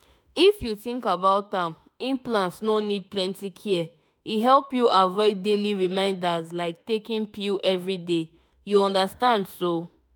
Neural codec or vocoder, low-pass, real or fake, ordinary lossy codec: autoencoder, 48 kHz, 32 numbers a frame, DAC-VAE, trained on Japanese speech; none; fake; none